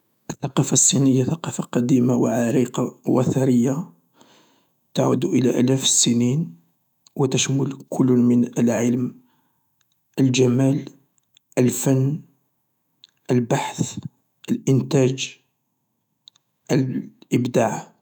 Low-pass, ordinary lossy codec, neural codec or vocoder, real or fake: 19.8 kHz; none; autoencoder, 48 kHz, 128 numbers a frame, DAC-VAE, trained on Japanese speech; fake